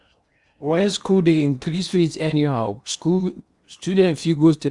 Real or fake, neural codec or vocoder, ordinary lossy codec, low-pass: fake; codec, 16 kHz in and 24 kHz out, 0.8 kbps, FocalCodec, streaming, 65536 codes; Opus, 64 kbps; 10.8 kHz